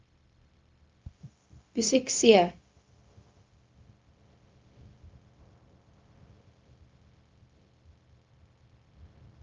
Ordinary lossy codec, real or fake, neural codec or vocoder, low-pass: Opus, 24 kbps; fake; codec, 16 kHz, 0.4 kbps, LongCat-Audio-Codec; 7.2 kHz